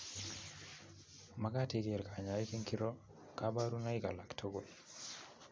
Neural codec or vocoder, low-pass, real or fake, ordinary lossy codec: none; none; real; none